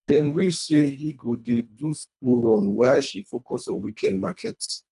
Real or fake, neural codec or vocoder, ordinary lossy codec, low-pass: fake; codec, 24 kHz, 1.5 kbps, HILCodec; none; 10.8 kHz